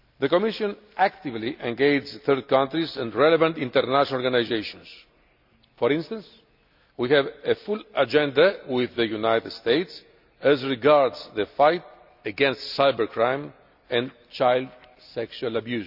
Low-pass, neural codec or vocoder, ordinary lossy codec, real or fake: 5.4 kHz; none; none; real